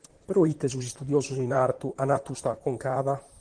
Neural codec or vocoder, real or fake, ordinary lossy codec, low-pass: vocoder, 44.1 kHz, 128 mel bands, Pupu-Vocoder; fake; Opus, 16 kbps; 9.9 kHz